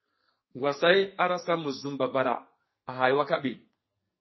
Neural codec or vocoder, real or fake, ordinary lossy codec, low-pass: codec, 44.1 kHz, 2.6 kbps, SNAC; fake; MP3, 24 kbps; 7.2 kHz